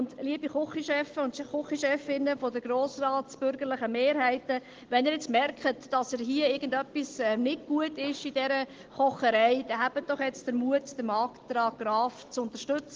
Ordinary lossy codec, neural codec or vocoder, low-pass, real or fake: Opus, 16 kbps; none; 7.2 kHz; real